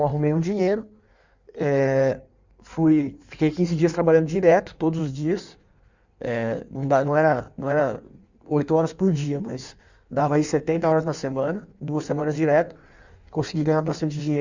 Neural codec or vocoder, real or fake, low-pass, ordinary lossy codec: codec, 16 kHz in and 24 kHz out, 1.1 kbps, FireRedTTS-2 codec; fake; 7.2 kHz; Opus, 64 kbps